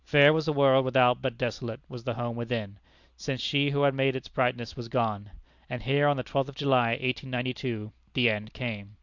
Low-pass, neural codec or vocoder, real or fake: 7.2 kHz; none; real